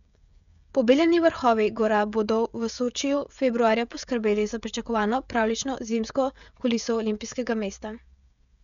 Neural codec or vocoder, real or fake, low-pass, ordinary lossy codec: codec, 16 kHz, 16 kbps, FreqCodec, smaller model; fake; 7.2 kHz; MP3, 96 kbps